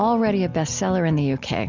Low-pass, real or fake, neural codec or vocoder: 7.2 kHz; real; none